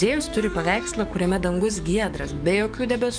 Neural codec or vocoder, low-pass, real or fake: codec, 44.1 kHz, 7.8 kbps, DAC; 9.9 kHz; fake